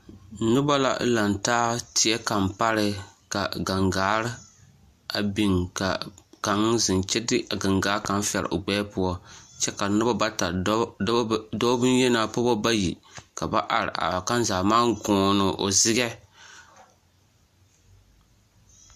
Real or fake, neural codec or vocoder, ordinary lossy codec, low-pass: real; none; MP3, 64 kbps; 14.4 kHz